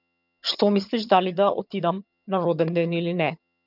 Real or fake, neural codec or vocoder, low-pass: fake; vocoder, 22.05 kHz, 80 mel bands, HiFi-GAN; 5.4 kHz